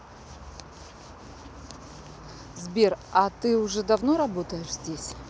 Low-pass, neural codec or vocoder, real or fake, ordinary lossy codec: none; none; real; none